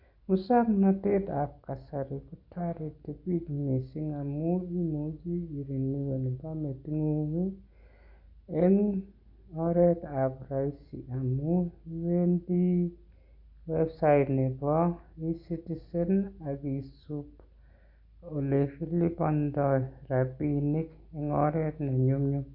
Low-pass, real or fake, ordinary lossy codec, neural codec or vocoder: 5.4 kHz; real; Opus, 64 kbps; none